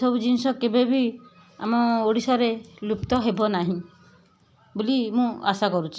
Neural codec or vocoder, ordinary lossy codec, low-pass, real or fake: none; none; none; real